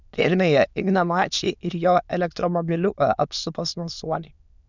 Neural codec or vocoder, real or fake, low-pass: autoencoder, 22.05 kHz, a latent of 192 numbers a frame, VITS, trained on many speakers; fake; 7.2 kHz